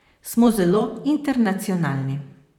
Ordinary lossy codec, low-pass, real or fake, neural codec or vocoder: none; 19.8 kHz; fake; vocoder, 44.1 kHz, 128 mel bands, Pupu-Vocoder